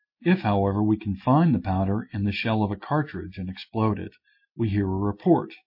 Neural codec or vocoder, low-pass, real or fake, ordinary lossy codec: none; 5.4 kHz; real; MP3, 32 kbps